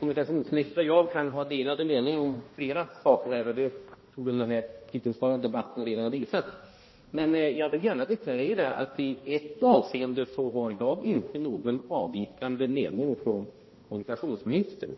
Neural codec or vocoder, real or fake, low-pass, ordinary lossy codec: codec, 16 kHz, 1 kbps, X-Codec, HuBERT features, trained on balanced general audio; fake; 7.2 kHz; MP3, 24 kbps